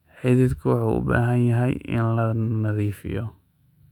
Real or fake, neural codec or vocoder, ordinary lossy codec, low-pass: fake; autoencoder, 48 kHz, 128 numbers a frame, DAC-VAE, trained on Japanese speech; none; 19.8 kHz